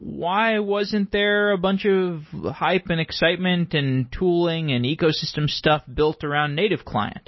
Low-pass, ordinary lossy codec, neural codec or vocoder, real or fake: 7.2 kHz; MP3, 24 kbps; none; real